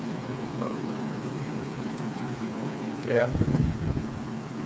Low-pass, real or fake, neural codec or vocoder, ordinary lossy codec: none; fake; codec, 16 kHz, 4 kbps, FreqCodec, smaller model; none